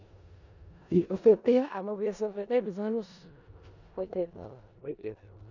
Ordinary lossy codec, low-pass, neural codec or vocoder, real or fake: none; 7.2 kHz; codec, 16 kHz in and 24 kHz out, 0.4 kbps, LongCat-Audio-Codec, four codebook decoder; fake